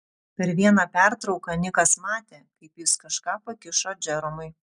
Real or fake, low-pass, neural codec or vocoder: real; 10.8 kHz; none